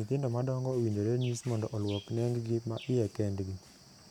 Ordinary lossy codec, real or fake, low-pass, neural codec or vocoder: none; real; 19.8 kHz; none